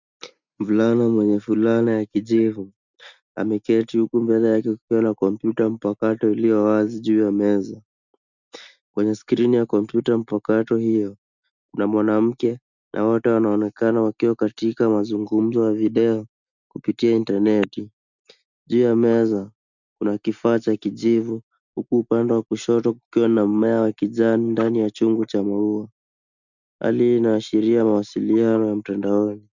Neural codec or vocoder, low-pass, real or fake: none; 7.2 kHz; real